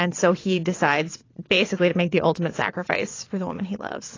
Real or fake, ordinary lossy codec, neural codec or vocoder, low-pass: fake; AAC, 32 kbps; vocoder, 22.05 kHz, 80 mel bands, WaveNeXt; 7.2 kHz